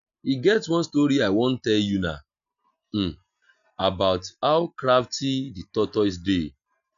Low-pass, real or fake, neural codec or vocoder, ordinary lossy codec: 7.2 kHz; real; none; none